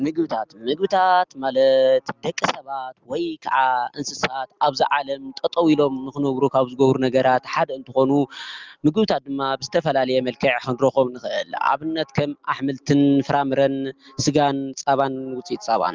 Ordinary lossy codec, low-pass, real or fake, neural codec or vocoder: Opus, 32 kbps; 7.2 kHz; real; none